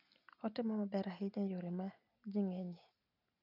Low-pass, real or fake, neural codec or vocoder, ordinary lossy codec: 5.4 kHz; real; none; none